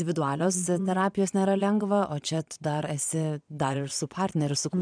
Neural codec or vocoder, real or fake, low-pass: vocoder, 22.05 kHz, 80 mel bands, Vocos; fake; 9.9 kHz